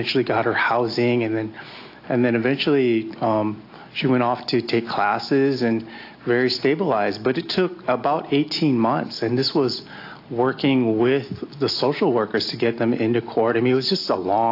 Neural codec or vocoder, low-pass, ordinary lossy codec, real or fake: none; 5.4 kHz; AAC, 32 kbps; real